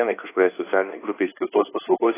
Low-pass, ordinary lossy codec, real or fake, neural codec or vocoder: 3.6 kHz; AAC, 16 kbps; fake; codec, 24 kHz, 1.2 kbps, DualCodec